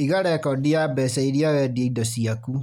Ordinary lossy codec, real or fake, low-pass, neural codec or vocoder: none; real; 19.8 kHz; none